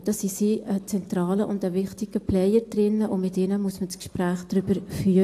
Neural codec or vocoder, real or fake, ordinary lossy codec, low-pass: none; real; AAC, 64 kbps; 14.4 kHz